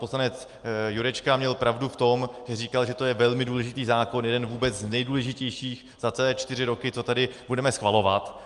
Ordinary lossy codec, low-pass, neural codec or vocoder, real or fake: Opus, 32 kbps; 10.8 kHz; none; real